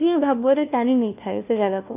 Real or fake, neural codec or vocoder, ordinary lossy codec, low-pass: fake; codec, 16 kHz, 1 kbps, FunCodec, trained on LibriTTS, 50 frames a second; none; 3.6 kHz